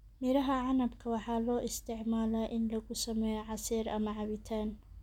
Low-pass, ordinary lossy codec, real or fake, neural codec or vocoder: 19.8 kHz; none; real; none